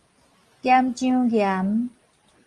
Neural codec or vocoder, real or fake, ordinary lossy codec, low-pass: none; real; Opus, 24 kbps; 10.8 kHz